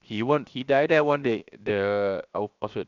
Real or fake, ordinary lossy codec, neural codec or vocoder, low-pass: fake; none; codec, 16 kHz, 0.7 kbps, FocalCodec; 7.2 kHz